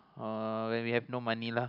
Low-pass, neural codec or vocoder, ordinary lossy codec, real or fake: 5.4 kHz; none; none; real